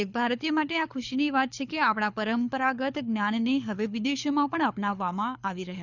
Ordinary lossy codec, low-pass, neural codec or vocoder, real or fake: none; 7.2 kHz; codec, 24 kHz, 6 kbps, HILCodec; fake